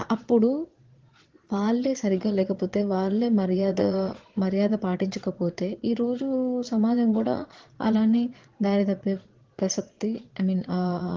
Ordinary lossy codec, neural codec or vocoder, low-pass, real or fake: Opus, 16 kbps; vocoder, 22.05 kHz, 80 mel bands, Vocos; 7.2 kHz; fake